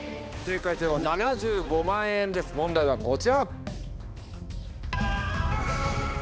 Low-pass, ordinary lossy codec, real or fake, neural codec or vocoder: none; none; fake; codec, 16 kHz, 2 kbps, X-Codec, HuBERT features, trained on balanced general audio